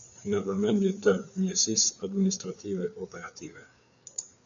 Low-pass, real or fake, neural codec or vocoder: 7.2 kHz; fake; codec, 16 kHz, 4 kbps, FreqCodec, larger model